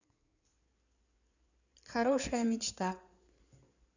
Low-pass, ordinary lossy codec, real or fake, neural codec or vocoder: 7.2 kHz; none; fake; codec, 16 kHz in and 24 kHz out, 2.2 kbps, FireRedTTS-2 codec